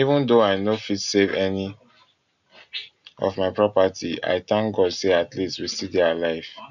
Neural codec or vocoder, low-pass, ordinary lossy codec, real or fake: none; 7.2 kHz; none; real